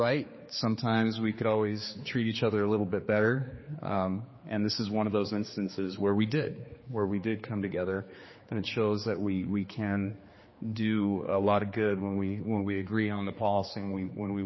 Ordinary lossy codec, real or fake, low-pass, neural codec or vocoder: MP3, 24 kbps; fake; 7.2 kHz; codec, 16 kHz, 4 kbps, X-Codec, HuBERT features, trained on general audio